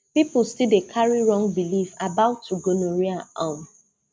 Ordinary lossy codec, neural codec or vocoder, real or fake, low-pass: none; none; real; none